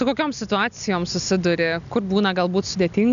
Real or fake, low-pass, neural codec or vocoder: real; 7.2 kHz; none